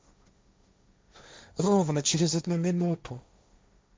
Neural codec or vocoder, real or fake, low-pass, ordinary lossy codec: codec, 16 kHz, 1.1 kbps, Voila-Tokenizer; fake; none; none